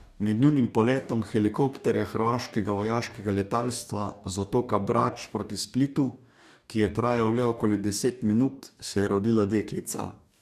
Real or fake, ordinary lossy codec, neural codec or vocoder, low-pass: fake; none; codec, 44.1 kHz, 2.6 kbps, DAC; 14.4 kHz